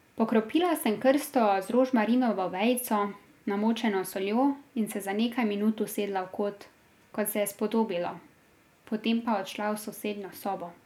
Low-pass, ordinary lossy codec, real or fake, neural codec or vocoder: 19.8 kHz; none; real; none